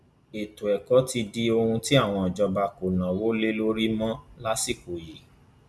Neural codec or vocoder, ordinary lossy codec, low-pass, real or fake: none; none; none; real